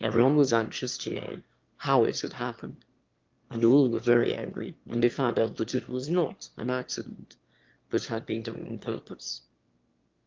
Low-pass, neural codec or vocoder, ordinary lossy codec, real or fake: 7.2 kHz; autoencoder, 22.05 kHz, a latent of 192 numbers a frame, VITS, trained on one speaker; Opus, 32 kbps; fake